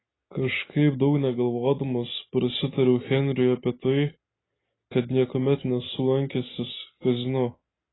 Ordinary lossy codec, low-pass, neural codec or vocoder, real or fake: AAC, 16 kbps; 7.2 kHz; none; real